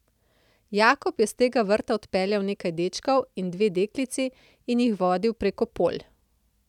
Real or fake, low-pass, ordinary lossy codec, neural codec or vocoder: real; 19.8 kHz; none; none